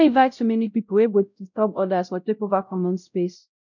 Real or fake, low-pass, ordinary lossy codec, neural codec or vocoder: fake; 7.2 kHz; none; codec, 16 kHz, 0.5 kbps, X-Codec, WavLM features, trained on Multilingual LibriSpeech